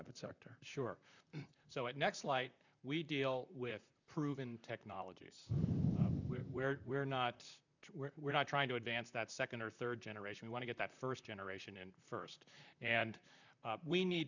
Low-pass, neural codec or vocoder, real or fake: 7.2 kHz; vocoder, 44.1 kHz, 128 mel bands, Pupu-Vocoder; fake